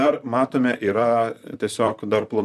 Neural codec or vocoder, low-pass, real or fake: vocoder, 44.1 kHz, 128 mel bands, Pupu-Vocoder; 14.4 kHz; fake